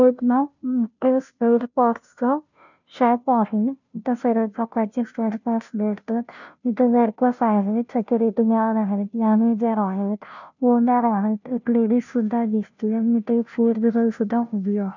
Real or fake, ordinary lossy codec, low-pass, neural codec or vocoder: fake; none; 7.2 kHz; codec, 16 kHz, 0.5 kbps, FunCodec, trained on Chinese and English, 25 frames a second